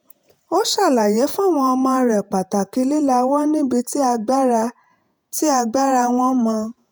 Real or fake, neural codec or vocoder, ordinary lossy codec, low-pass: fake; vocoder, 48 kHz, 128 mel bands, Vocos; none; none